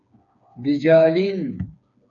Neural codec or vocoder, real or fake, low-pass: codec, 16 kHz, 4 kbps, FreqCodec, smaller model; fake; 7.2 kHz